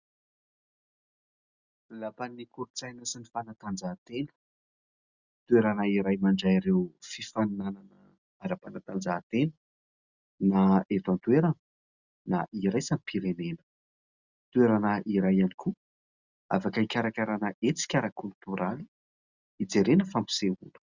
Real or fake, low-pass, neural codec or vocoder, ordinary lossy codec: real; 7.2 kHz; none; Opus, 64 kbps